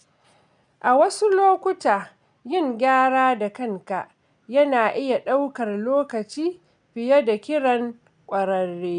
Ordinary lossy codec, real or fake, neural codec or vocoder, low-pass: none; real; none; 9.9 kHz